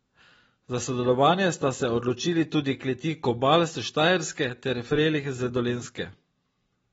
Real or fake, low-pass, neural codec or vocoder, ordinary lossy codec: real; 19.8 kHz; none; AAC, 24 kbps